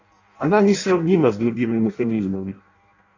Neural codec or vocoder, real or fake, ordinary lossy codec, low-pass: codec, 16 kHz in and 24 kHz out, 0.6 kbps, FireRedTTS-2 codec; fake; AAC, 48 kbps; 7.2 kHz